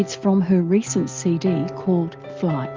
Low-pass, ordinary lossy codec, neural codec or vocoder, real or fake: 7.2 kHz; Opus, 24 kbps; none; real